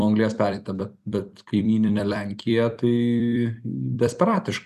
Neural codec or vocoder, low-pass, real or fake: vocoder, 44.1 kHz, 128 mel bands every 256 samples, BigVGAN v2; 14.4 kHz; fake